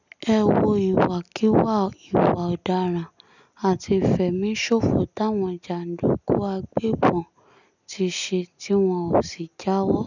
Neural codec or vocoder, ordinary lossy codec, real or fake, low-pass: none; none; real; 7.2 kHz